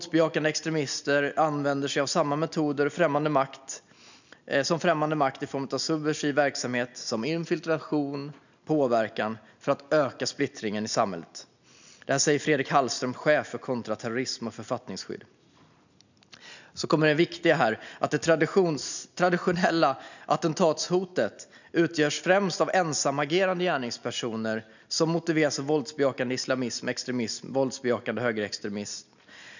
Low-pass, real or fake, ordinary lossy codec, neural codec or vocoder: 7.2 kHz; real; none; none